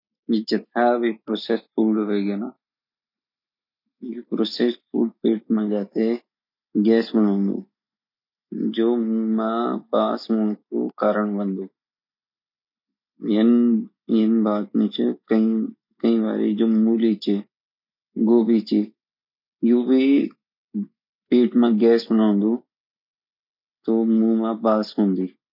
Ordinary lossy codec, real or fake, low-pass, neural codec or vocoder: none; real; 5.4 kHz; none